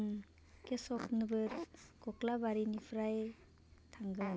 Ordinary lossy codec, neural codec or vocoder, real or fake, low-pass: none; none; real; none